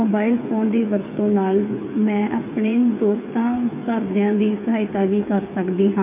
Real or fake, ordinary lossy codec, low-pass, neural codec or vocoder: fake; MP3, 24 kbps; 3.6 kHz; codec, 16 kHz, 8 kbps, FreqCodec, smaller model